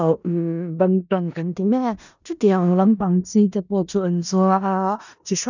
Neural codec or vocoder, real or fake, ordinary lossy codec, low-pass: codec, 16 kHz in and 24 kHz out, 0.4 kbps, LongCat-Audio-Codec, four codebook decoder; fake; none; 7.2 kHz